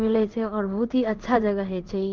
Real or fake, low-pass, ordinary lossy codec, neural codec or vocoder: fake; 7.2 kHz; Opus, 16 kbps; codec, 16 kHz in and 24 kHz out, 1 kbps, XY-Tokenizer